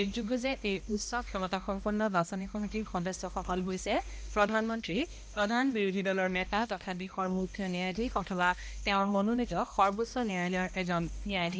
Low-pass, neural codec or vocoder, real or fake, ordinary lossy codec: none; codec, 16 kHz, 1 kbps, X-Codec, HuBERT features, trained on balanced general audio; fake; none